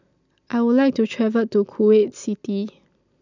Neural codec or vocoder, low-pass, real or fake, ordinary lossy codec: none; 7.2 kHz; real; none